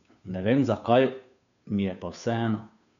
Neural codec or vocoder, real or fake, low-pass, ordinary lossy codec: codec, 16 kHz, 2 kbps, FunCodec, trained on Chinese and English, 25 frames a second; fake; 7.2 kHz; none